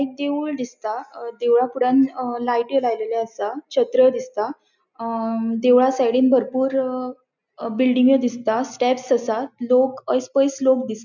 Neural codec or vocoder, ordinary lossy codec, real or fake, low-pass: none; none; real; 7.2 kHz